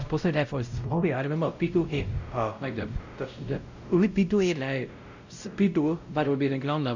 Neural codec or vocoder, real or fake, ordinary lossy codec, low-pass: codec, 16 kHz, 0.5 kbps, X-Codec, WavLM features, trained on Multilingual LibriSpeech; fake; Opus, 64 kbps; 7.2 kHz